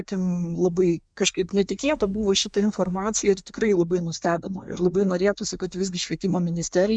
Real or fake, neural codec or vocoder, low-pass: fake; codec, 24 kHz, 1 kbps, SNAC; 9.9 kHz